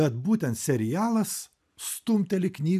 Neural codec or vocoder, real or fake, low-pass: none; real; 14.4 kHz